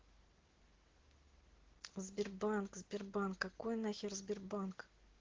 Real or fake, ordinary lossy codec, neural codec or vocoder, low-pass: real; Opus, 16 kbps; none; 7.2 kHz